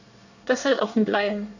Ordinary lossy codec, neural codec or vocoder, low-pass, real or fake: none; codec, 24 kHz, 1 kbps, SNAC; 7.2 kHz; fake